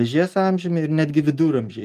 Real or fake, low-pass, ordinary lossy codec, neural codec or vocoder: real; 14.4 kHz; Opus, 32 kbps; none